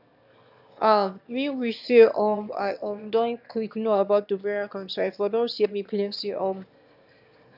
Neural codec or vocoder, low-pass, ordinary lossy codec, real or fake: autoencoder, 22.05 kHz, a latent of 192 numbers a frame, VITS, trained on one speaker; 5.4 kHz; AAC, 48 kbps; fake